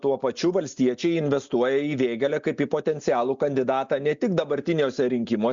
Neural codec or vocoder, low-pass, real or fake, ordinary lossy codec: none; 7.2 kHz; real; Opus, 64 kbps